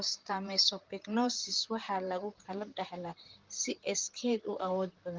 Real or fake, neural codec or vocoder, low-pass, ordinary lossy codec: fake; vocoder, 44.1 kHz, 128 mel bands, Pupu-Vocoder; 7.2 kHz; Opus, 32 kbps